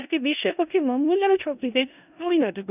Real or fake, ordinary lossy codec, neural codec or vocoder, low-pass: fake; none; codec, 16 kHz in and 24 kHz out, 0.4 kbps, LongCat-Audio-Codec, four codebook decoder; 3.6 kHz